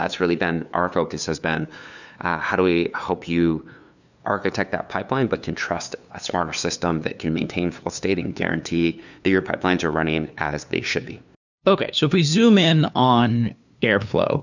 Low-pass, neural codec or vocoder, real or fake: 7.2 kHz; codec, 16 kHz, 2 kbps, FunCodec, trained on LibriTTS, 25 frames a second; fake